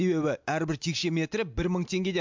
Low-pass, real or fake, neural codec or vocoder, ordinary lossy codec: 7.2 kHz; real; none; MP3, 64 kbps